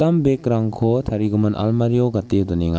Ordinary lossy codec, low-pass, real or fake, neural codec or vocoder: none; none; real; none